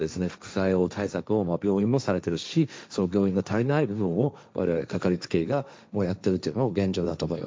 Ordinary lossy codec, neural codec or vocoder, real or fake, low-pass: none; codec, 16 kHz, 1.1 kbps, Voila-Tokenizer; fake; none